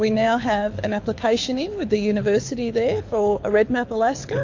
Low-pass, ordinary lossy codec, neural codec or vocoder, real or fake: 7.2 kHz; AAC, 48 kbps; codec, 24 kHz, 6 kbps, HILCodec; fake